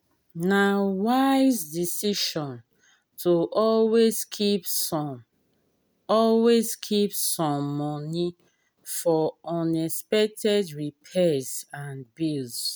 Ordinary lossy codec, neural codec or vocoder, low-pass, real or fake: none; none; none; real